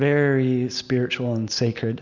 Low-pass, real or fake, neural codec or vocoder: 7.2 kHz; real; none